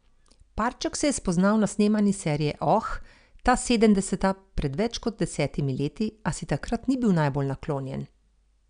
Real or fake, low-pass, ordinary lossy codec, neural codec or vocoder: real; 9.9 kHz; none; none